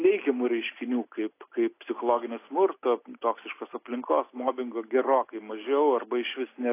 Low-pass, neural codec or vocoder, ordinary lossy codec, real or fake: 3.6 kHz; none; AAC, 24 kbps; real